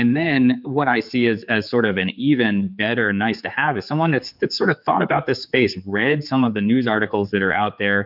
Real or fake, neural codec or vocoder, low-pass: fake; codec, 16 kHz, 4 kbps, X-Codec, HuBERT features, trained on general audio; 5.4 kHz